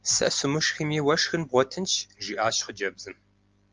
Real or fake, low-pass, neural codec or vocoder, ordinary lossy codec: real; 7.2 kHz; none; Opus, 32 kbps